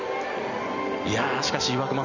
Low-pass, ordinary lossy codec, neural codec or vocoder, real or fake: 7.2 kHz; none; none; real